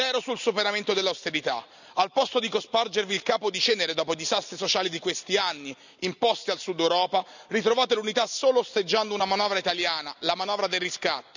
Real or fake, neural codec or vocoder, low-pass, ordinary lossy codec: real; none; 7.2 kHz; none